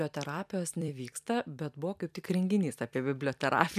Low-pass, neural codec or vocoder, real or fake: 14.4 kHz; vocoder, 44.1 kHz, 128 mel bands every 256 samples, BigVGAN v2; fake